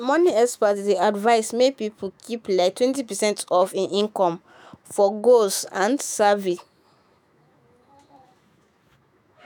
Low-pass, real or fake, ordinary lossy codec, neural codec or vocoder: none; fake; none; autoencoder, 48 kHz, 128 numbers a frame, DAC-VAE, trained on Japanese speech